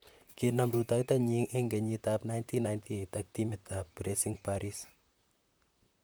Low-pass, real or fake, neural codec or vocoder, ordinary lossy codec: none; fake; vocoder, 44.1 kHz, 128 mel bands, Pupu-Vocoder; none